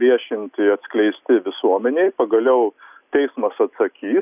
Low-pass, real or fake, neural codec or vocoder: 3.6 kHz; real; none